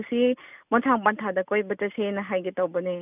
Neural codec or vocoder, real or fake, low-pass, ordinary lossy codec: none; real; 3.6 kHz; none